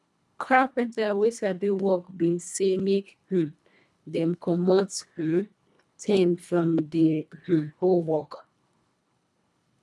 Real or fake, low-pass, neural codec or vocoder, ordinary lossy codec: fake; none; codec, 24 kHz, 1.5 kbps, HILCodec; none